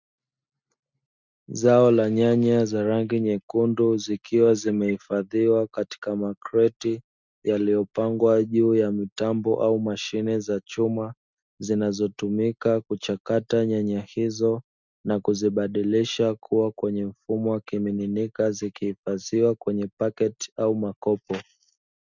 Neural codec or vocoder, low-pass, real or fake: none; 7.2 kHz; real